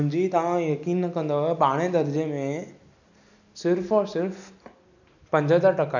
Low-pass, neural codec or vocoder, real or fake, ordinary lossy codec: 7.2 kHz; none; real; none